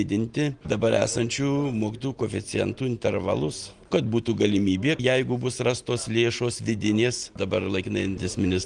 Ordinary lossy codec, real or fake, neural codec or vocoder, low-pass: Opus, 24 kbps; real; none; 9.9 kHz